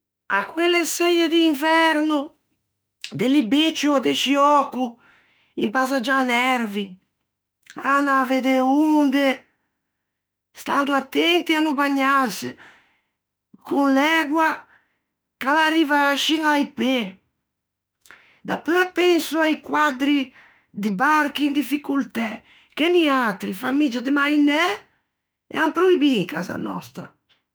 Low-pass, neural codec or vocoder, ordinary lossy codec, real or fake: none; autoencoder, 48 kHz, 32 numbers a frame, DAC-VAE, trained on Japanese speech; none; fake